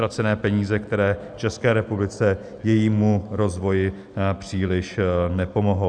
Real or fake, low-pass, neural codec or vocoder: real; 9.9 kHz; none